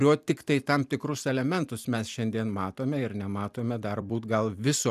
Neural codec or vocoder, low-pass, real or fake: vocoder, 44.1 kHz, 128 mel bands every 256 samples, BigVGAN v2; 14.4 kHz; fake